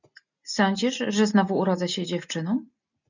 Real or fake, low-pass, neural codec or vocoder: real; 7.2 kHz; none